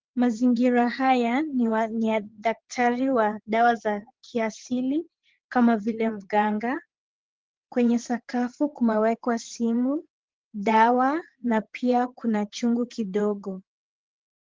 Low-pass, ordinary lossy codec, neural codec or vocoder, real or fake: 7.2 kHz; Opus, 16 kbps; vocoder, 22.05 kHz, 80 mel bands, WaveNeXt; fake